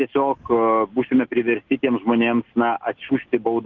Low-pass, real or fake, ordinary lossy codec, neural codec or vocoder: 7.2 kHz; real; Opus, 16 kbps; none